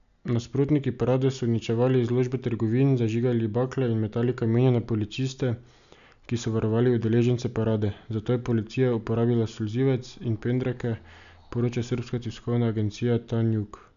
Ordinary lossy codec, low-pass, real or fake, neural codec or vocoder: none; 7.2 kHz; real; none